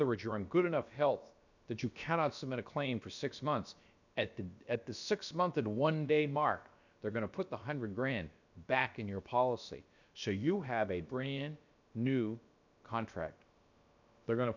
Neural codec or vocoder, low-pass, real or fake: codec, 16 kHz, about 1 kbps, DyCAST, with the encoder's durations; 7.2 kHz; fake